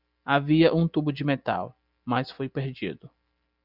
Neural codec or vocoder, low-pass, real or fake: none; 5.4 kHz; real